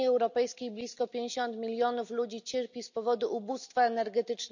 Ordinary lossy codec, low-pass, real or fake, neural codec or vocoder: none; 7.2 kHz; real; none